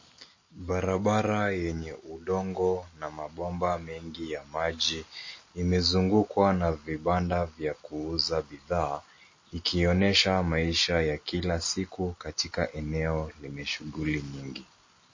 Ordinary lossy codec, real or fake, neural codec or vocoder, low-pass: MP3, 32 kbps; real; none; 7.2 kHz